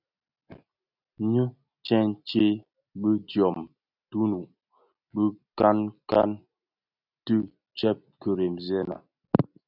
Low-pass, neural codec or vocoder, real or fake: 5.4 kHz; none; real